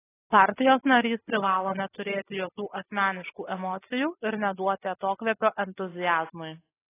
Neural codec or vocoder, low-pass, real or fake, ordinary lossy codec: none; 3.6 kHz; real; AAC, 16 kbps